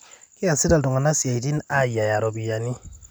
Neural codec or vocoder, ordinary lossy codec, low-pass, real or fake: none; none; none; real